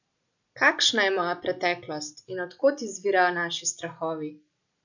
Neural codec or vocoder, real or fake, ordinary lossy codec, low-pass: none; real; none; 7.2 kHz